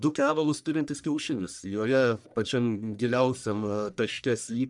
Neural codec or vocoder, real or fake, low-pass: codec, 44.1 kHz, 1.7 kbps, Pupu-Codec; fake; 10.8 kHz